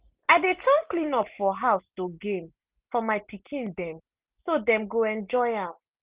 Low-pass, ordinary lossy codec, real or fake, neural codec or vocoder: 3.6 kHz; Opus, 24 kbps; real; none